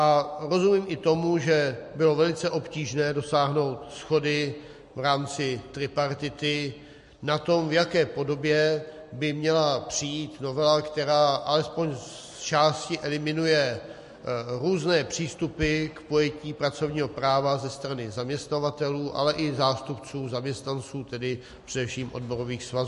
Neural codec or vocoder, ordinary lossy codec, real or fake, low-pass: none; MP3, 48 kbps; real; 14.4 kHz